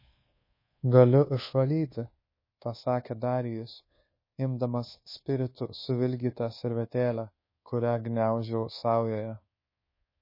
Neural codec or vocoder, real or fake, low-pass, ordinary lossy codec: codec, 24 kHz, 1.2 kbps, DualCodec; fake; 5.4 kHz; MP3, 24 kbps